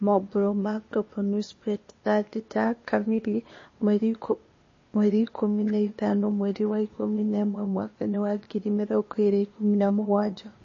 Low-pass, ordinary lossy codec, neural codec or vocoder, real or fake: 7.2 kHz; MP3, 32 kbps; codec, 16 kHz, 0.8 kbps, ZipCodec; fake